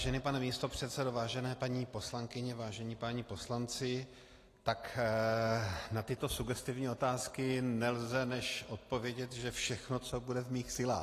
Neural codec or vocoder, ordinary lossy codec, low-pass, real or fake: none; AAC, 48 kbps; 14.4 kHz; real